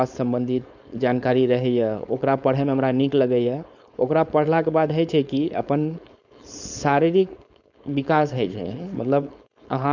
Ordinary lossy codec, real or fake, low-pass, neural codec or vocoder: none; fake; 7.2 kHz; codec, 16 kHz, 4.8 kbps, FACodec